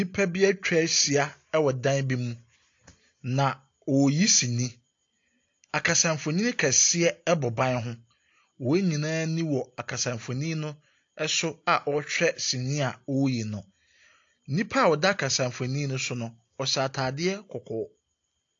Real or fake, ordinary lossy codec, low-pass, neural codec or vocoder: real; AAC, 48 kbps; 7.2 kHz; none